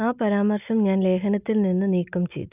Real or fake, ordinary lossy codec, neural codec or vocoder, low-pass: real; none; none; 3.6 kHz